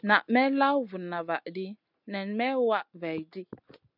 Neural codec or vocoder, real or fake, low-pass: none; real; 5.4 kHz